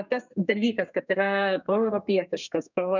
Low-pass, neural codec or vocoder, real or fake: 7.2 kHz; codec, 32 kHz, 1.9 kbps, SNAC; fake